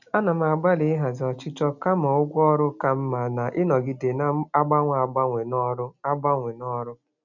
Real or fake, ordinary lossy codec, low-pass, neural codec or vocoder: real; none; 7.2 kHz; none